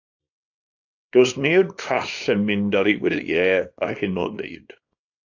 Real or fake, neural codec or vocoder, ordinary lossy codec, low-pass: fake; codec, 24 kHz, 0.9 kbps, WavTokenizer, small release; AAC, 48 kbps; 7.2 kHz